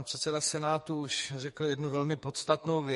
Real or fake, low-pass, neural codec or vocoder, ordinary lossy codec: fake; 14.4 kHz; codec, 44.1 kHz, 2.6 kbps, SNAC; MP3, 48 kbps